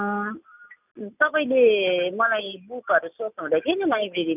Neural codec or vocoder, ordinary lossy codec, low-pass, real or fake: none; none; 3.6 kHz; real